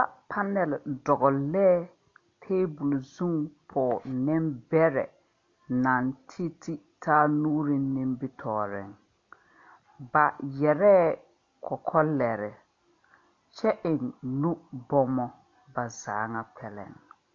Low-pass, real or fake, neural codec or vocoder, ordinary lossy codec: 7.2 kHz; real; none; AAC, 48 kbps